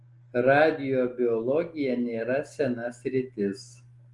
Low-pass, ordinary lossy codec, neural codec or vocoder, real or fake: 10.8 kHz; Opus, 24 kbps; none; real